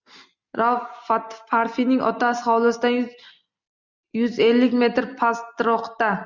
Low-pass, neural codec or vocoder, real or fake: 7.2 kHz; none; real